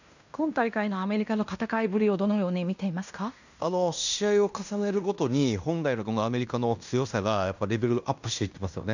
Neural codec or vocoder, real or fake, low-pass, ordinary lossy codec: codec, 16 kHz in and 24 kHz out, 0.9 kbps, LongCat-Audio-Codec, fine tuned four codebook decoder; fake; 7.2 kHz; none